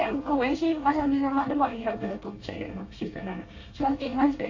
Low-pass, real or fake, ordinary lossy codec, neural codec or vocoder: 7.2 kHz; fake; AAC, 48 kbps; codec, 24 kHz, 1 kbps, SNAC